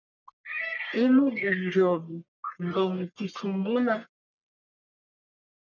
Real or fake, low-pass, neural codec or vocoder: fake; 7.2 kHz; codec, 44.1 kHz, 1.7 kbps, Pupu-Codec